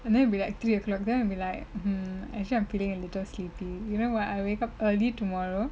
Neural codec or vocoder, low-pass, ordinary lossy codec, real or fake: none; none; none; real